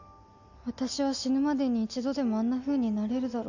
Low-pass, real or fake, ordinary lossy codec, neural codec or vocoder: 7.2 kHz; real; none; none